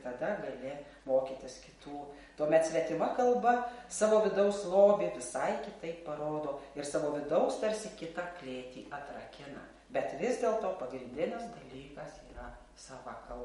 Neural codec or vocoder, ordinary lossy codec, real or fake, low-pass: none; MP3, 48 kbps; real; 19.8 kHz